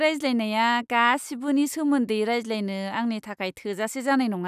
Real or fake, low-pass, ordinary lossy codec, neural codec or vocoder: real; 14.4 kHz; none; none